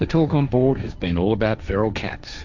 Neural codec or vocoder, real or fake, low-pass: codec, 16 kHz, 1.1 kbps, Voila-Tokenizer; fake; 7.2 kHz